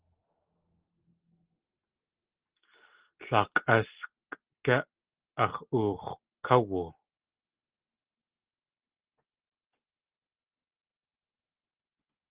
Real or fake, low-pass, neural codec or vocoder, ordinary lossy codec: real; 3.6 kHz; none; Opus, 16 kbps